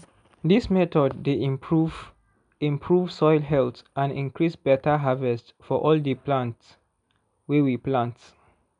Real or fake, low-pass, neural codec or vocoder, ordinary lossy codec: real; 9.9 kHz; none; none